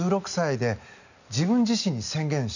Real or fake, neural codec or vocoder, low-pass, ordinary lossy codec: real; none; 7.2 kHz; none